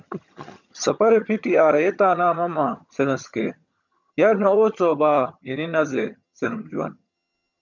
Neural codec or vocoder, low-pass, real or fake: vocoder, 22.05 kHz, 80 mel bands, HiFi-GAN; 7.2 kHz; fake